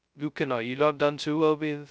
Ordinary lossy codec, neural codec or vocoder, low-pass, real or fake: none; codec, 16 kHz, 0.2 kbps, FocalCodec; none; fake